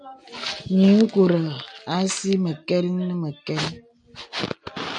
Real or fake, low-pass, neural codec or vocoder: real; 9.9 kHz; none